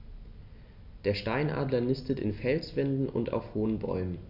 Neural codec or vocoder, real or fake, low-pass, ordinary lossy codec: none; real; 5.4 kHz; none